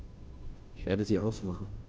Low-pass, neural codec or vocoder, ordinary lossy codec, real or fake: none; codec, 16 kHz, 0.5 kbps, FunCodec, trained on Chinese and English, 25 frames a second; none; fake